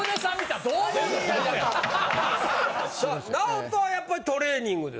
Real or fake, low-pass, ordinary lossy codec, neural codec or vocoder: real; none; none; none